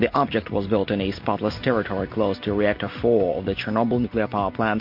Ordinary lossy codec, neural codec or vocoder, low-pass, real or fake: MP3, 32 kbps; none; 5.4 kHz; real